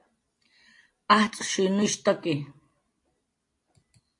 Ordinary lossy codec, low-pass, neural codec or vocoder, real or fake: AAC, 48 kbps; 10.8 kHz; none; real